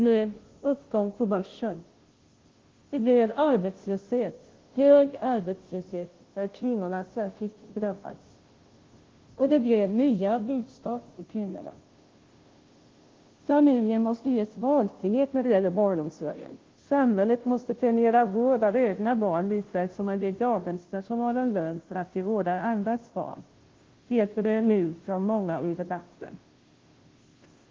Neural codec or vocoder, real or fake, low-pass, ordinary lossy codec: codec, 16 kHz, 0.5 kbps, FunCodec, trained on Chinese and English, 25 frames a second; fake; 7.2 kHz; Opus, 16 kbps